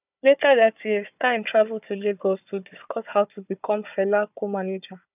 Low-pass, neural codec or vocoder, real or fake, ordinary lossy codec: 3.6 kHz; codec, 16 kHz, 4 kbps, FunCodec, trained on Chinese and English, 50 frames a second; fake; none